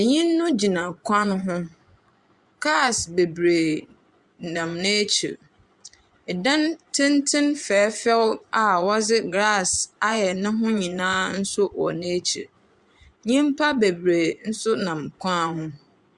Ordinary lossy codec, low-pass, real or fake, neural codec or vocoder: Opus, 64 kbps; 10.8 kHz; fake; vocoder, 24 kHz, 100 mel bands, Vocos